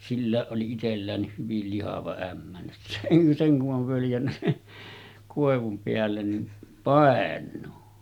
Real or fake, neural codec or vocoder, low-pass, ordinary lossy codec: real; none; 19.8 kHz; none